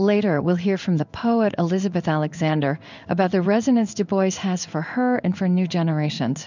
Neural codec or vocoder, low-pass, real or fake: codec, 16 kHz in and 24 kHz out, 1 kbps, XY-Tokenizer; 7.2 kHz; fake